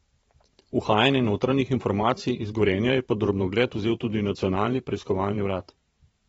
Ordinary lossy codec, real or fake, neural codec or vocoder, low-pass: AAC, 24 kbps; fake; vocoder, 44.1 kHz, 128 mel bands every 512 samples, BigVGAN v2; 19.8 kHz